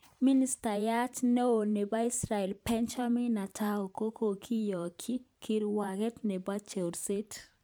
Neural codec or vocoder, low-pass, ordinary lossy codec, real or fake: vocoder, 44.1 kHz, 128 mel bands every 512 samples, BigVGAN v2; none; none; fake